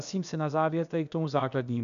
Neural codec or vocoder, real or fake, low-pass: codec, 16 kHz, about 1 kbps, DyCAST, with the encoder's durations; fake; 7.2 kHz